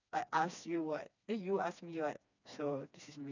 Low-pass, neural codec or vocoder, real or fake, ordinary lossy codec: 7.2 kHz; codec, 16 kHz, 2 kbps, FreqCodec, smaller model; fake; none